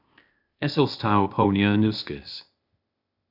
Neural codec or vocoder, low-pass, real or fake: codec, 16 kHz, 0.8 kbps, ZipCodec; 5.4 kHz; fake